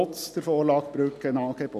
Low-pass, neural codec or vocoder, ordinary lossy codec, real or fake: 14.4 kHz; none; none; real